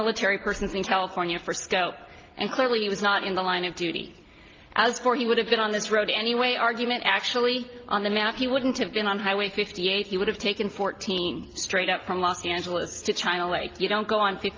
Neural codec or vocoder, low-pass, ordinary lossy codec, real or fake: none; 7.2 kHz; Opus, 32 kbps; real